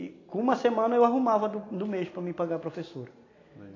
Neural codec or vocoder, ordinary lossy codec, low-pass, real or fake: none; AAC, 32 kbps; 7.2 kHz; real